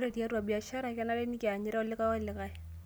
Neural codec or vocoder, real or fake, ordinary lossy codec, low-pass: none; real; none; none